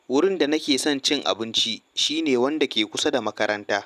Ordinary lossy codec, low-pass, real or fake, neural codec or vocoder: none; 14.4 kHz; real; none